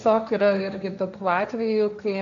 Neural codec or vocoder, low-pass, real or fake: codec, 16 kHz, 1.1 kbps, Voila-Tokenizer; 7.2 kHz; fake